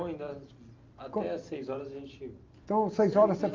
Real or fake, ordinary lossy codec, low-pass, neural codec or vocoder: real; Opus, 24 kbps; 7.2 kHz; none